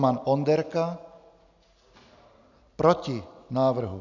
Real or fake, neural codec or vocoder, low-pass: real; none; 7.2 kHz